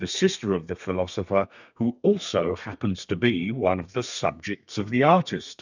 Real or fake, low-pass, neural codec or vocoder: fake; 7.2 kHz; codec, 32 kHz, 1.9 kbps, SNAC